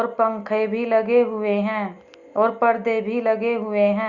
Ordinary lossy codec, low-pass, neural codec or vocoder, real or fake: Opus, 64 kbps; 7.2 kHz; none; real